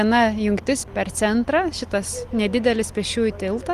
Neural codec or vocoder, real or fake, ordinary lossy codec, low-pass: none; real; Opus, 24 kbps; 14.4 kHz